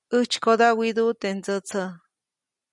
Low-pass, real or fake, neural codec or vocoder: 10.8 kHz; real; none